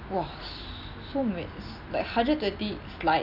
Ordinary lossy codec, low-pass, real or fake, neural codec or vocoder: none; 5.4 kHz; real; none